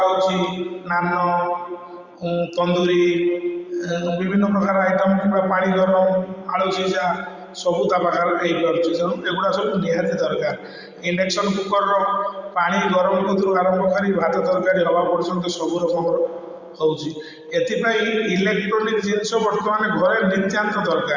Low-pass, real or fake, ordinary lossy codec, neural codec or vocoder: 7.2 kHz; fake; Opus, 64 kbps; vocoder, 44.1 kHz, 128 mel bands every 512 samples, BigVGAN v2